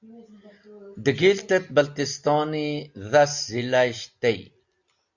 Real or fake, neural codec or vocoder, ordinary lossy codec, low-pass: real; none; Opus, 64 kbps; 7.2 kHz